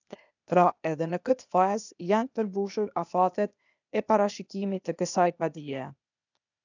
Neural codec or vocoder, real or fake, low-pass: codec, 16 kHz, 0.8 kbps, ZipCodec; fake; 7.2 kHz